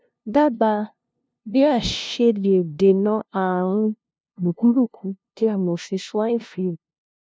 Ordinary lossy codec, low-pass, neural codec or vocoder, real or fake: none; none; codec, 16 kHz, 0.5 kbps, FunCodec, trained on LibriTTS, 25 frames a second; fake